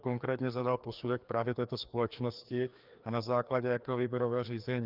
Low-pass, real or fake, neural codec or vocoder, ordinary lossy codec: 5.4 kHz; fake; codec, 16 kHz, 2 kbps, FreqCodec, larger model; Opus, 24 kbps